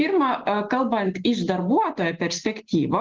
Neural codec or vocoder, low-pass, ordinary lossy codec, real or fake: none; 7.2 kHz; Opus, 16 kbps; real